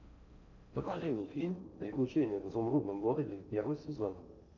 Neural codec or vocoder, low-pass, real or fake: codec, 16 kHz in and 24 kHz out, 0.6 kbps, FocalCodec, streaming, 4096 codes; 7.2 kHz; fake